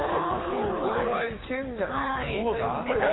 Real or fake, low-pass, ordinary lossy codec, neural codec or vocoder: fake; 7.2 kHz; AAC, 16 kbps; codec, 24 kHz, 6 kbps, HILCodec